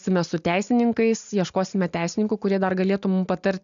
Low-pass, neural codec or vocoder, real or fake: 7.2 kHz; none; real